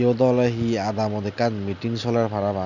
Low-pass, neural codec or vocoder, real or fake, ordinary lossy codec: 7.2 kHz; none; real; none